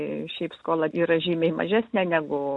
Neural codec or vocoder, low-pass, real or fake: none; 10.8 kHz; real